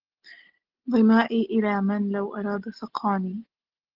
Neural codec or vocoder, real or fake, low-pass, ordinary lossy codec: none; real; 5.4 kHz; Opus, 16 kbps